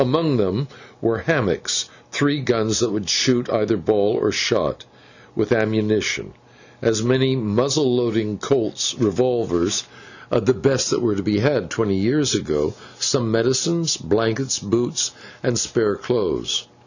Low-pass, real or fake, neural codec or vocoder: 7.2 kHz; real; none